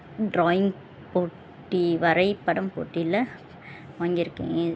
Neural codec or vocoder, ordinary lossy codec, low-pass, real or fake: none; none; none; real